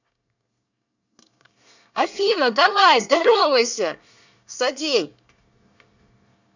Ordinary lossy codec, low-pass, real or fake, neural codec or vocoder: none; 7.2 kHz; fake; codec, 24 kHz, 1 kbps, SNAC